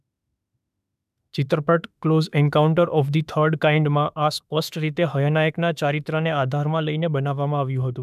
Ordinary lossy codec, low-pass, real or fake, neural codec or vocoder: none; 14.4 kHz; fake; autoencoder, 48 kHz, 32 numbers a frame, DAC-VAE, trained on Japanese speech